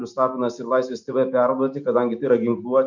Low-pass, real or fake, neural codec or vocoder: 7.2 kHz; real; none